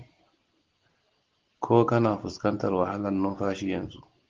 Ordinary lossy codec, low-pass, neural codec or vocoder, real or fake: Opus, 16 kbps; 7.2 kHz; none; real